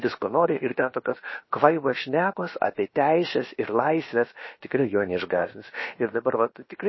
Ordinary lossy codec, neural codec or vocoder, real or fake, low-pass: MP3, 24 kbps; codec, 16 kHz, about 1 kbps, DyCAST, with the encoder's durations; fake; 7.2 kHz